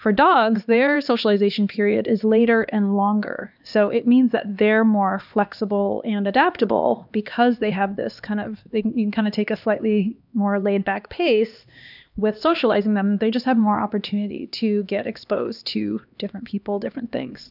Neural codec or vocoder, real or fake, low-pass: codec, 16 kHz, 2 kbps, X-Codec, HuBERT features, trained on LibriSpeech; fake; 5.4 kHz